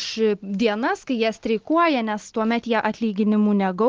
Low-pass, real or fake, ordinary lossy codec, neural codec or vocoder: 7.2 kHz; real; Opus, 16 kbps; none